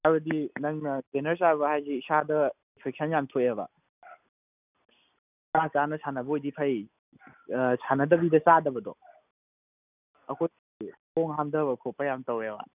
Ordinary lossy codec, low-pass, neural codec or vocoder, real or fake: none; 3.6 kHz; none; real